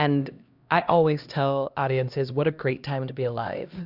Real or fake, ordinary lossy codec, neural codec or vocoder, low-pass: fake; Opus, 64 kbps; codec, 16 kHz, 1 kbps, X-Codec, HuBERT features, trained on LibriSpeech; 5.4 kHz